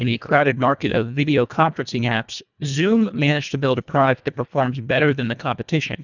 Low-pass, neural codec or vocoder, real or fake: 7.2 kHz; codec, 24 kHz, 1.5 kbps, HILCodec; fake